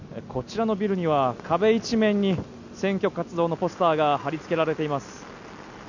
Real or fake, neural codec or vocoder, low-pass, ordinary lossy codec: real; none; 7.2 kHz; none